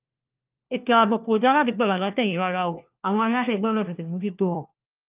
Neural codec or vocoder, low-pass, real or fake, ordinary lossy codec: codec, 16 kHz, 1 kbps, FunCodec, trained on LibriTTS, 50 frames a second; 3.6 kHz; fake; Opus, 24 kbps